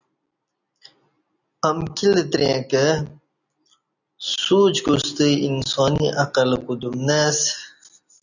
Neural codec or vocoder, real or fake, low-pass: none; real; 7.2 kHz